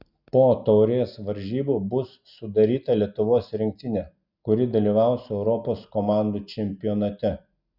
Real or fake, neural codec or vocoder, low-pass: real; none; 5.4 kHz